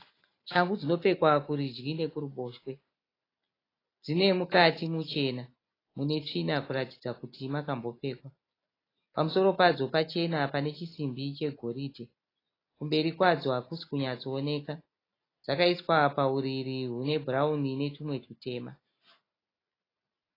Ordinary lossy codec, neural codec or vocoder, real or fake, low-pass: AAC, 24 kbps; none; real; 5.4 kHz